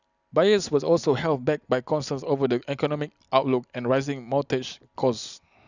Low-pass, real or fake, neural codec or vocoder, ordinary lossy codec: 7.2 kHz; real; none; none